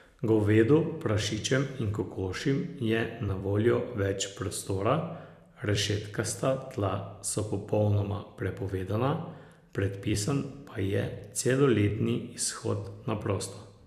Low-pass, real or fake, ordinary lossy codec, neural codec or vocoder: 14.4 kHz; fake; none; vocoder, 44.1 kHz, 128 mel bands every 256 samples, BigVGAN v2